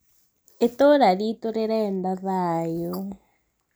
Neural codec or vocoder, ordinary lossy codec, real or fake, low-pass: none; none; real; none